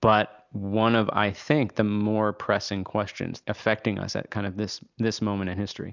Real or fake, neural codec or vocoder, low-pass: real; none; 7.2 kHz